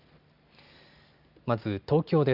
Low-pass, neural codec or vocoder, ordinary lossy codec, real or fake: 5.4 kHz; none; Opus, 64 kbps; real